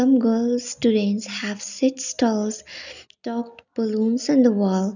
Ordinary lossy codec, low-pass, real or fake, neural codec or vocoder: none; 7.2 kHz; real; none